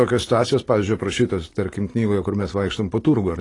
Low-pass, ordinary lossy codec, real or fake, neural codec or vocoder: 10.8 kHz; AAC, 32 kbps; real; none